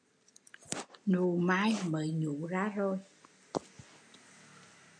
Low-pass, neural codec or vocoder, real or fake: 9.9 kHz; none; real